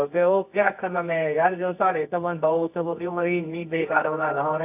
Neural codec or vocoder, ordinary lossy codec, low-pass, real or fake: codec, 24 kHz, 0.9 kbps, WavTokenizer, medium music audio release; AAC, 32 kbps; 3.6 kHz; fake